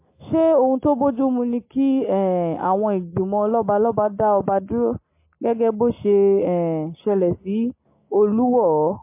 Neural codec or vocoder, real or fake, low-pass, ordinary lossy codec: none; real; 3.6 kHz; MP3, 24 kbps